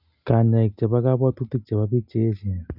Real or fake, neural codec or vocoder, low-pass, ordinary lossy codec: real; none; 5.4 kHz; none